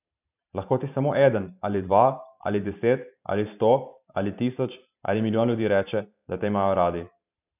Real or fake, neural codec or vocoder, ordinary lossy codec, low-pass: fake; vocoder, 44.1 kHz, 128 mel bands every 512 samples, BigVGAN v2; none; 3.6 kHz